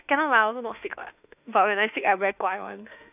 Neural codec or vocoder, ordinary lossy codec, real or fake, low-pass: autoencoder, 48 kHz, 32 numbers a frame, DAC-VAE, trained on Japanese speech; none; fake; 3.6 kHz